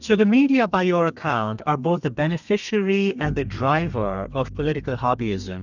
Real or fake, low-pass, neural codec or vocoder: fake; 7.2 kHz; codec, 32 kHz, 1.9 kbps, SNAC